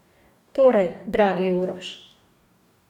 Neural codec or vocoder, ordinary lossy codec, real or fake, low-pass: codec, 44.1 kHz, 2.6 kbps, DAC; none; fake; 19.8 kHz